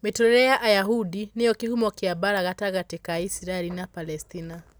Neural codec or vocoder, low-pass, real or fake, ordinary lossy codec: none; none; real; none